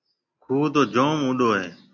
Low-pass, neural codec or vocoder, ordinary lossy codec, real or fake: 7.2 kHz; none; AAC, 48 kbps; real